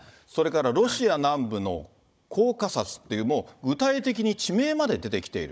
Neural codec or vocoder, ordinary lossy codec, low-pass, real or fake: codec, 16 kHz, 16 kbps, FunCodec, trained on Chinese and English, 50 frames a second; none; none; fake